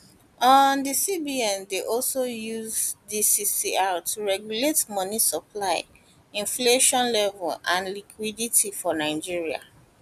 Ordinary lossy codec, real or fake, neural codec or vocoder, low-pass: none; real; none; 14.4 kHz